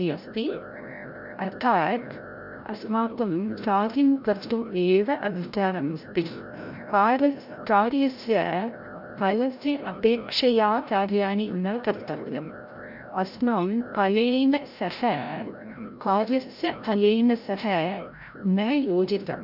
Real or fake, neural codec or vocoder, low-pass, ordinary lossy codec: fake; codec, 16 kHz, 0.5 kbps, FreqCodec, larger model; 5.4 kHz; none